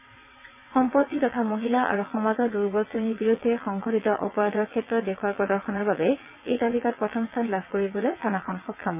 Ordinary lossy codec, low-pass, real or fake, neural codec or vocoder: none; 3.6 kHz; fake; vocoder, 22.05 kHz, 80 mel bands, WaveNeXt